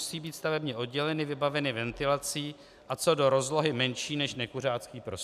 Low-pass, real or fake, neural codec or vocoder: 14.4 kHz; real; none